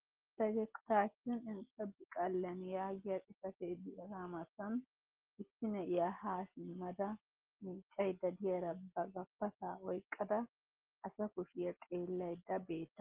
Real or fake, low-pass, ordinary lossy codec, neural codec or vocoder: real; 3.6 kHz; Opus, 16 kbps; none